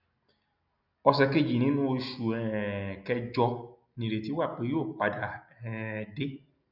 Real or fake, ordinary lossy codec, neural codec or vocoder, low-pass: real; none; none; 5.4 kHz